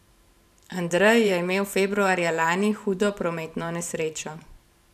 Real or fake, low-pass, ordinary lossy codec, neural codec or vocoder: fake; 14.4 kHz; none; vocoder, 44.1 kHz, 128 mel bands every 512 samples, BigVGAN v2